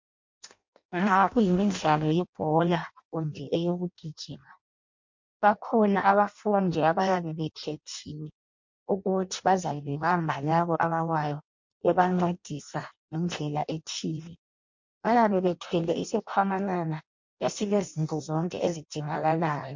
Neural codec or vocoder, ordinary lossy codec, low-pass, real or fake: codec, 16 kHz in and 24 kHz out, 0.6 kbps, FireRedTTS-2 codec; MP3, 48 kbps; 7.2 kHz; fake